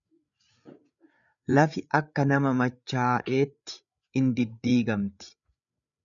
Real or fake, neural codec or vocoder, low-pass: fake; codec, 16 kHz, 8 kbps, FreqCodec, larger model; 7.2 kHz